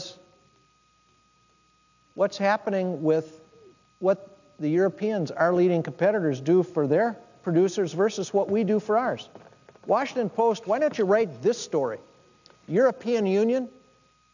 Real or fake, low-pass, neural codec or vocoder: real; 7.2 kHz; none